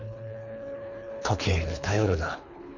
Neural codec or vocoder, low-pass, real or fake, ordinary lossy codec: codec, 24 kHz, 3 kbps, HILCodec; 7.2 kHz; fake; none